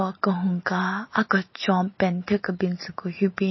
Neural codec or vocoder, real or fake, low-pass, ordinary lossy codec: none; real; 7.2 kHz; MP3, 24 kbps